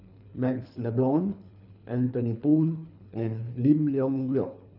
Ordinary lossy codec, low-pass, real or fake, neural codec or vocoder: none; 5.4 kHz; fake; codec, 24 kHz, 3 kbps, HILCodec